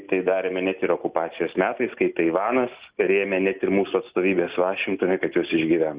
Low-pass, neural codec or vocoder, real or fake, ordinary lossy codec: 3.6 kHz; none; real; Opus, 64 kbps